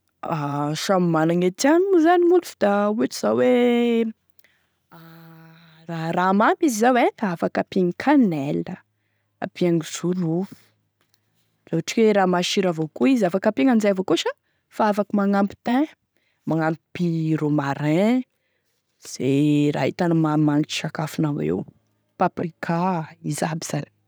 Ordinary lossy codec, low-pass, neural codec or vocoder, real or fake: none; none; none; real